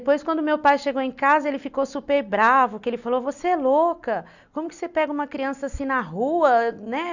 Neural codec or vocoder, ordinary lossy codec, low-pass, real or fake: none; none; 7.2 kHz; real